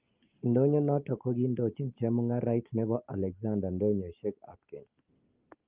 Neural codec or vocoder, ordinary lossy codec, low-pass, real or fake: none; Opus, 32 kbps; 3.6 kHz; real